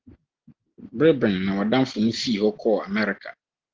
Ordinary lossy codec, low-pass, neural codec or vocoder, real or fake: Opus, 16 kbps; 7.2 kHz; none; real